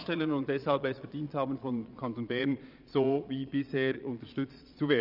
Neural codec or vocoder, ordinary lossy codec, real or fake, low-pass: vocoder, 22.05 kHz, 80 mel bands, Vocos; none; fake; 5.4 kHz